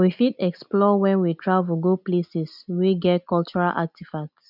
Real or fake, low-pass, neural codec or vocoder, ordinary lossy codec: real; 5.4 kHz; none; none